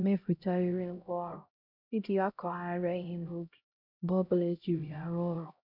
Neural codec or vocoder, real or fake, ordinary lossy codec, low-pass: codec, 16 kHz, 0.5 kbps, X-Codec, HuBERT features, trained on LibriSpeech; fake; none; 5.4 kHz